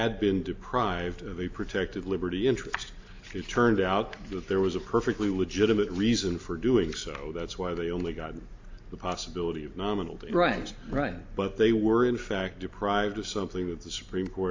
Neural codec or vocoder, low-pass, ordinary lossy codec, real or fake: none; 7.2 kHz; AAC, 48 kbps; real